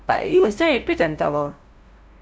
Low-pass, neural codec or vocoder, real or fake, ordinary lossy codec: none; codec, 16 kHz, 0.5 kbps, FunCodec, trained on LibriTTS, 25 frames a second; fake; none